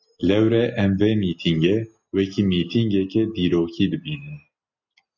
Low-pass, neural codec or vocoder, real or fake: 7.2 kHz; none; real